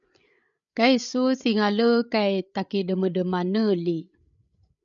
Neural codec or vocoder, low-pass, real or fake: codec, 16 kHz, 8 kbps, FreqCodec, larger model; 7.2 kHz; fake